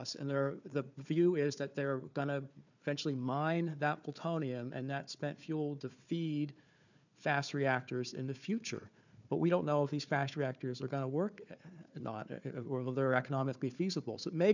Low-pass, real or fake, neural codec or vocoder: 7.2 kHz; fake; codec, 16 kHz, 4 kbps, FunCodec, trained on Chinese and English, 50 frames a second